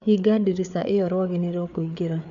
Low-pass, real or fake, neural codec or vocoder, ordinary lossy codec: 7.2 kHz; fake; codec, 16 kHz, 4 kbps, FreqCodec, larger model; none